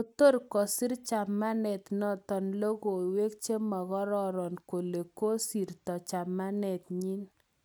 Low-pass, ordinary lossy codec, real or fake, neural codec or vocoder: none; none; real; none